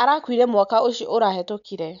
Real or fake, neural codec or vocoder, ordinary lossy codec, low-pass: real; none; none; 7.2 kHz